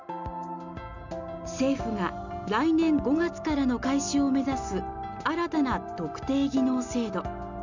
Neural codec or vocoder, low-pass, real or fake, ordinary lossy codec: none; 7.2 kHz; real; none